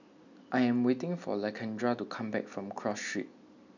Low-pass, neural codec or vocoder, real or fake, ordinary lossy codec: 7.2 kHz; none; real; none